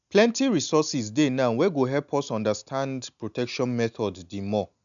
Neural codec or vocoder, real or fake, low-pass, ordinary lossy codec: none; real; 7.2 kHz; none